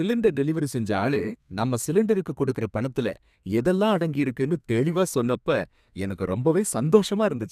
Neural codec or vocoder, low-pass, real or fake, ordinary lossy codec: codec, 32 kHz, 1.9 kbps, SNAC; 14.4 kHz; fake; none